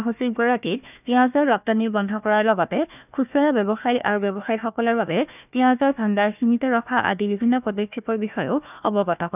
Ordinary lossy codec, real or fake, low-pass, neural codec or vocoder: none; fake; 3.6 kHz; codec, 16 kHz, 1 kbps, FunCodec, trained on Chinese and English, 50 frames a second